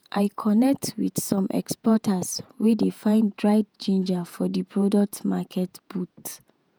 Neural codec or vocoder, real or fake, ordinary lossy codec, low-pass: vocoder, 48 kHz, 128 mel bands, Vocos; fake; none; none